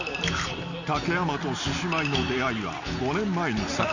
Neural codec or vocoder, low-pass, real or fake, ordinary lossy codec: none; 7.2 kHz; real; none